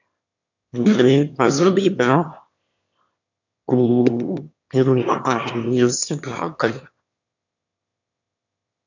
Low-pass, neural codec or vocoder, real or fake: 7.2 kHz; autoencoder, 22.05 kHz, a latent of 192 numbers a frame, VITS, trained on one speaker; fake